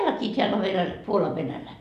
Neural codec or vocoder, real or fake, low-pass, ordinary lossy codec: none; real; 14.4 kHz; none